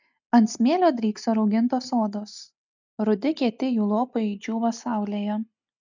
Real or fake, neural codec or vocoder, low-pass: real; none; 7.2 kHz